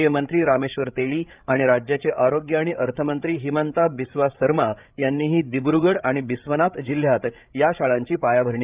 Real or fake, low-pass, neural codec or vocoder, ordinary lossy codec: fake; 3.6 kHz; codec, 16 kHz, 8 kbps, FreqCodec, larger model; Opus, 24 kbps